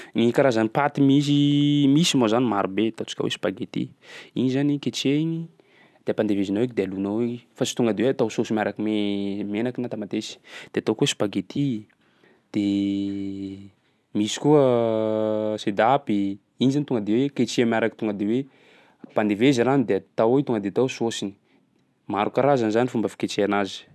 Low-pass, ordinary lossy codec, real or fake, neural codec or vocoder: none; none; real; none